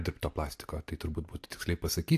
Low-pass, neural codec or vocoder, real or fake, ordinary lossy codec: 14.4 kHz; none; real; AAC, 64 kbps